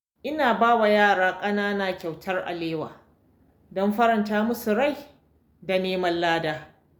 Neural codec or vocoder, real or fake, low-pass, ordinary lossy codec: none; real; none; none